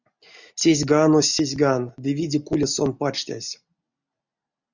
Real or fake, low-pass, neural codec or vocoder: real; 7.2 kHz; none